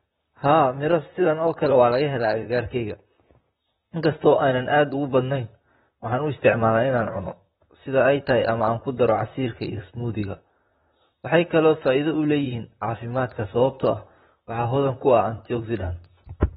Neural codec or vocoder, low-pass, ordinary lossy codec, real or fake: vocoder, 44.1 kHz, 128 mel bands, Pupu-Vocoder; 19.8 kHz; AAC, 16 kbps; fake